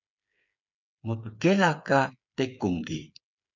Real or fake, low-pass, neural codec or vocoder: fake; 7.2 kHz; codec, 16 kHz, 8 kbps, FreqCodec, smaller model